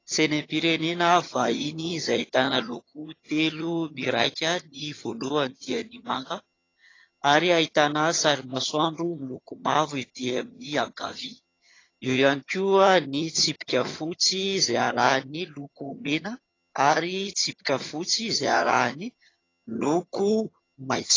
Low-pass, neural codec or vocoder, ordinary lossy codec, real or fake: 7.2 kHz; vocoder, 22.05 kHz, 80 mel bands, HiFi-GAN; AAC, 32 kbps; fake